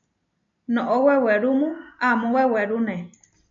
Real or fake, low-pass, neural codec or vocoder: real; 7.2 kHz; none